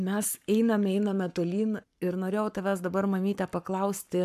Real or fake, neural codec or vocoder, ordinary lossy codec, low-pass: fake; codec, 44.1 kHz, 7.8 kbps, Pupu-Codec; AAC, 96 kbps; 14.4 kHz